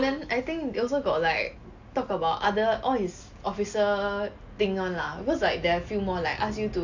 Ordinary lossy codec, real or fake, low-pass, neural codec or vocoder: AAC, 48 kbps; real; 7.2 kHz; none